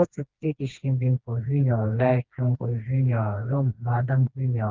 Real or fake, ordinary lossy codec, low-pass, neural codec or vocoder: fake; Opus, 32 kbps; 7.2 kHz; codec, 16 kHz, 2 kbps, FreqCodec, smaller model